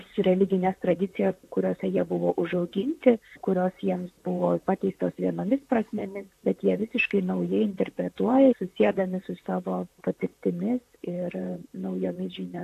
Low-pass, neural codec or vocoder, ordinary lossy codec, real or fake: 14.4 kHz; vocoder, 44.1 kHz, 128 mel bands, Pupu-Vocoder; AAC, 64 kbps; fake